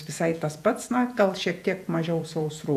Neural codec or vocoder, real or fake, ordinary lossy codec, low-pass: vocoder, 48 kHz, 128 mel bands, Vocos; fake; AAC, 96 kbps; 14.4 kHz